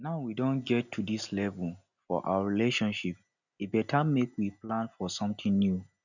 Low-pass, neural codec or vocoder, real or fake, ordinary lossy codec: 7.2 kHz; none; real; none